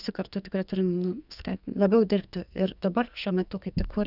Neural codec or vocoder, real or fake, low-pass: codec, 44.1 kHz, 2.6 kbps, SNAC; fake; 5.4 kHz